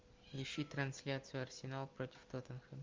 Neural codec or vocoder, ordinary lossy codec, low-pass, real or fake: none; Opus, 32 kbps; 7.2 kHz; real